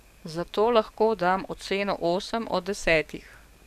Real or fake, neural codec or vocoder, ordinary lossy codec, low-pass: fake; codec, 44.1 kHz, 7.8 kbps, DAC; none; 14.4 kHz